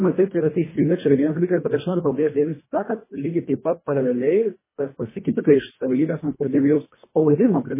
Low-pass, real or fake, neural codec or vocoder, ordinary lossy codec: 3.6 kHz; fake; codec, 24 kHz, 1.5 kbps, HILCodec; MP3, 16 kbps